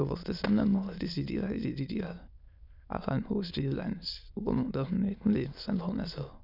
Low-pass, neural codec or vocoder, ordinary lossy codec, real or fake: 5.4 kHz; autoencoder, 22.05 kHz, a latent of 192 numbers a frame, VITS, trained on many speakers; none; fake